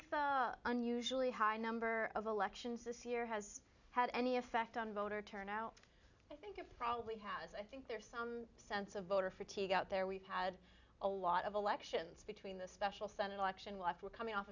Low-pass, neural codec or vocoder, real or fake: 7.2 kHz; none; real